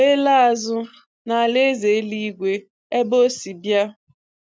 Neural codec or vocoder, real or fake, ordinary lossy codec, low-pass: none; real; none; none